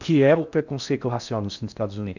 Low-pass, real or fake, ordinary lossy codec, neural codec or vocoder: 7.2 kHz; fake; none; codec, 16 kHz in and 24 kHz out, 0.6 kbps, FocalCodec, streaming, 4096 codes